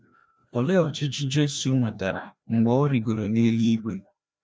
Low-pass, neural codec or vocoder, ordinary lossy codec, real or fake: none; codec, 16 kHz, 1 kbps, FreqCodec, larger model; none; fake